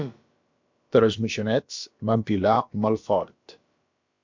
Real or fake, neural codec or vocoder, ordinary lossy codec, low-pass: fake; codec, 16 kHz, about 1 kbps, DyCAST, with the encoder's durations; MP3, 64 kbps; 7.2 kHz